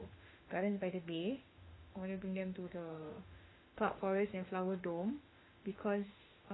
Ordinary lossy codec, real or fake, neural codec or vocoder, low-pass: AAC, 16 kbps; fake; autoencoder, 48 kHz, 32 numbers a frame, DAC-VAE, trained on Japanese speech; 7.2 kHz